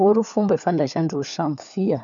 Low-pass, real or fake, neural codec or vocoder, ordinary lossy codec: 7.2 kHz; fake; codec, 16 kHz, 4 kbps, FunCodec, trained on LibriTTS, 50 frames a second; none